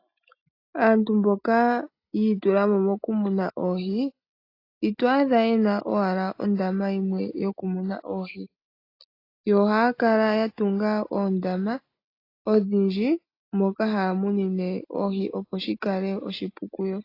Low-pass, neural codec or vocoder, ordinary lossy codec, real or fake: 5.4 kHz; none; AAC, 32 kbps; real